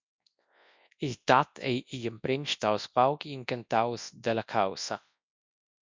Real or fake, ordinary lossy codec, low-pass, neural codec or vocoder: fake; MP3, 64 kbps; 7.2 kHz; codec, 24 kHz, 0.9 kbps, WavTokenizer, large speech release